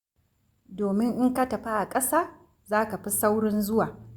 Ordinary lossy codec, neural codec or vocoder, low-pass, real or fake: Opus, 64 kbps; none; 19.8 kHz; real